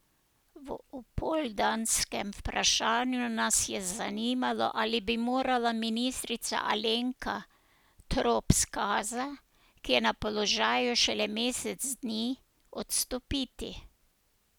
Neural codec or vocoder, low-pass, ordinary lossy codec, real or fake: none; none; none; real